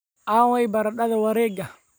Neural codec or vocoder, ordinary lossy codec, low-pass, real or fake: none; none; none; real